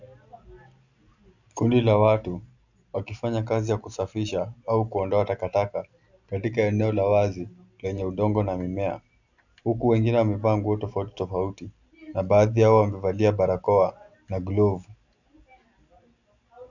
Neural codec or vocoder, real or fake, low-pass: none; real; 7.2 kHz